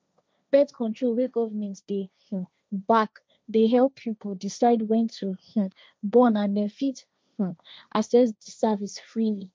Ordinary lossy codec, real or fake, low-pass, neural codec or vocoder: none; fake; none; codec, 16 kHz, 1.1 kbps, Voila-Tokenizer